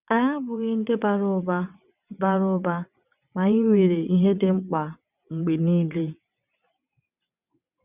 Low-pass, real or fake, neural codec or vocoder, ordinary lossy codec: 3.6 kHz; fake; vocoder, 22.05 kHz, 80 mel bands, WaveNeXt; none